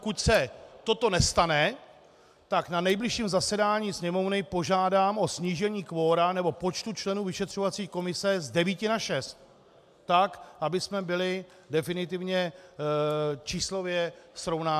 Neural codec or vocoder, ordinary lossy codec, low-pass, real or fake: none; MP3, 96 kbps; 14.4 kHz; real